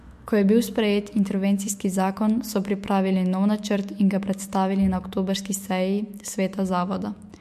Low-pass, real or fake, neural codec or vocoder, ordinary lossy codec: 14.4 kHz; fake; autoencoder, 48 kHz, 128 numbers a frame, DAC-VAE, trained on Japanese speech; MP3, 64 kbps